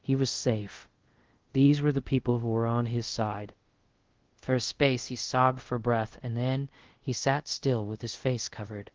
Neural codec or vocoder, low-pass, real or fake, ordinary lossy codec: codec, 24 kHz, 0.5 kbps, DualCodec; 7.2 kHz; fake; Opus, 16 kbps